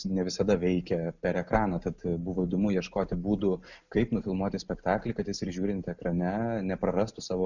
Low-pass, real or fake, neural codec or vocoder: 7.2 kHz; real; none